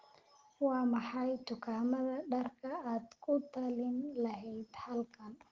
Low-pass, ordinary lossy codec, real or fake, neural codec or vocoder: 7.2 kHz; Opus, 16 kbps; real; none